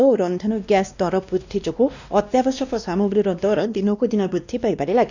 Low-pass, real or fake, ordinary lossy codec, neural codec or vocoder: 7.2 kHz; fake; none; codec, 16 kHz, 1 kbps, X-Codec, WavLM features, trained on Multilingual LibriSpeech